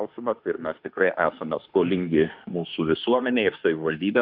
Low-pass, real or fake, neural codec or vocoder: 5.4 kHz; fake; autoencoder, 48 kHz, 32 numbers a frame, DAC-VAE, trained on Japanese speech